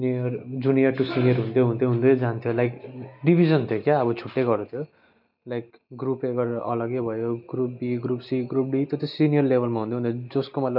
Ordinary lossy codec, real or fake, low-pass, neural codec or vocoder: none; real; 5.4 kHz; none